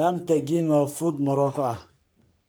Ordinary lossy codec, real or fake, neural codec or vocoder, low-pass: none; fake; codec, 44.1 kHz, 3.4 kbps, Pupu-Codec; none